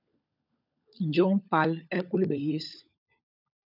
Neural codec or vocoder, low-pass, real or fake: codec, 16 kHz, 16 kbps, FunCodec, trained on LibriTTS, 50 frames a second; 5.4 kHz; fake